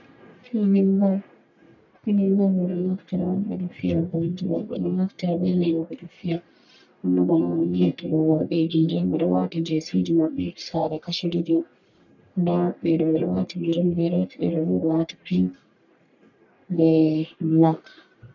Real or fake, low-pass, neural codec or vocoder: fake; 7.2 kHz; codec, 44.1 kHz, 1.7 kbps, Pupu-Codec